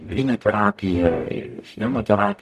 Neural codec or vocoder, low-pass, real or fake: codec, 44.1 kHz, 0.9 kbps, DAC; 14.4 kHz; fake